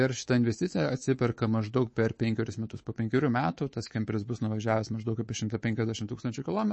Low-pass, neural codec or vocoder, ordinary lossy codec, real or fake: 10.8 kHz; codec, 24 kHz, 3.1 kbps, DualCodec; MP3, 32 kbps; fake